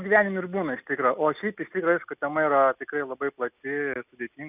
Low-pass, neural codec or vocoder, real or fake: 3.6 kHz; none; real